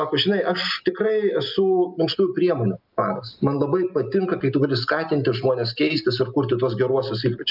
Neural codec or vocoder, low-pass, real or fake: none; 5.4 kHz; real